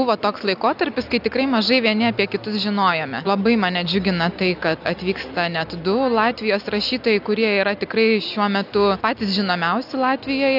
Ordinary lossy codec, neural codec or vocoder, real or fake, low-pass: AAC, 48 kbps; none; real; 5.4 kHz